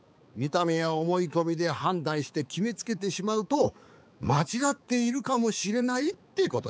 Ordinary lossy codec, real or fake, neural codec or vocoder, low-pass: none; fake; codec, 16 kHz, 4 kbps, X-Codec, HuBERT features, trained on balanced general audio; none